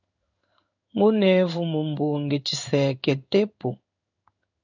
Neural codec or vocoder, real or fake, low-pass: codec, 16 kHz in and 24 kHz out, 1 kbps, XY-Tokenizer; fake; 7.2 kHz